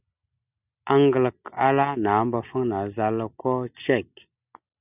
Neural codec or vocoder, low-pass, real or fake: none; 3.6 kHz; real